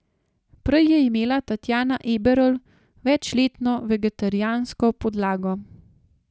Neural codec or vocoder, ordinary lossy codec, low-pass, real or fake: none; none; none; real